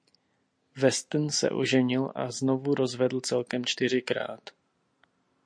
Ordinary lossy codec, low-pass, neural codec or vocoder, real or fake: MP3, 48 kbps; 9.9 kHz; none; real